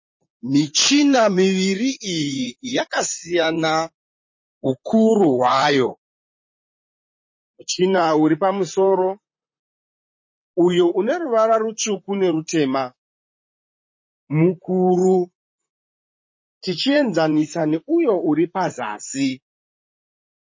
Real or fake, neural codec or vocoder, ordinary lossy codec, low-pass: fake; vocoder, 44.1 kHz, 128 mel bands, Pupu-Vocoder; MP3, 32 kbps; 7.2 kHz